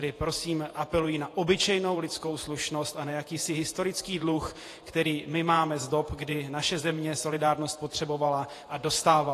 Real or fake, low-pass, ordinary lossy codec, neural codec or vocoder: fake; 14.4 kHz; AAC, 48 kbps; vocoder, 48 kHz, 128 mel bands, Vocos